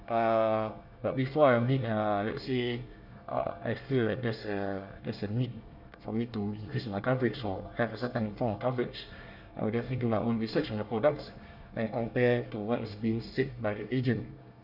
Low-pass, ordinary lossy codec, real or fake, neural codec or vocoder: 5.4 kHz; none; fake; codec, 24 kHz, 1 kbps, SNAC